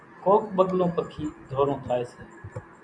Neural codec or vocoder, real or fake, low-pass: none; real; 9.9 kHz